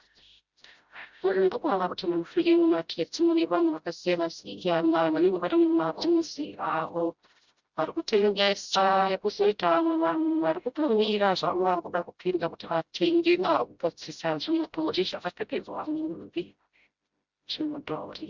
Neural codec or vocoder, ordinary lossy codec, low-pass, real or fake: codec, 16 kHz, 0.5 kbps, FreqCodec, smaller model; Opus, 64 kbps; 7.2 kHz; fake